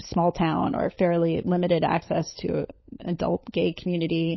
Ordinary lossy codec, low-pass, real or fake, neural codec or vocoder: MP3, 24 kbps; 7.2 kHz; fake; codec, 16 kHz, 16 kbps, FunCodec, trained on LibriTTS, 50 frames a second